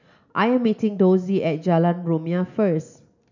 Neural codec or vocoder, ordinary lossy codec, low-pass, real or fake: none; none; 7.2 kHz; real